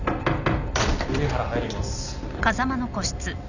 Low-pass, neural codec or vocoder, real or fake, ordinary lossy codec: 7.2 kHz; none; real; none